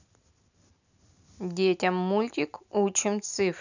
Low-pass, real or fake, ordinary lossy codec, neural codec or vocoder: 7.2 kHz; real; none; none